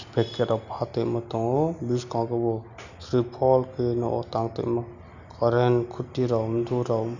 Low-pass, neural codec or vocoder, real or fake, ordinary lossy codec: 7.2 kHz; none; real; none